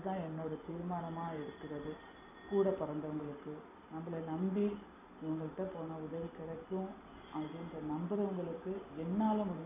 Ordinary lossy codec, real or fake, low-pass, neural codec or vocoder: none; real; 3.6 kHz; none